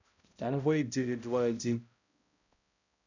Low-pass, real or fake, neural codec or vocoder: 7.2 kHz; fake; codec, 16 kHz, 0.5 kbps, X-Codec, HuBERT features, trained on balanced general audio